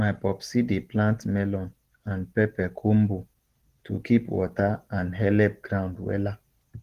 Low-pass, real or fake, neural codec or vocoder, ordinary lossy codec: 14.4 kHz; real; none; Opus, 16 kbps